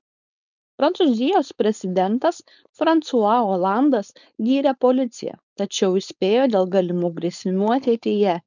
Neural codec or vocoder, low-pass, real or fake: codec, 16 kHz, 4.8 kbps, FACodec; 7.2 kHz; fake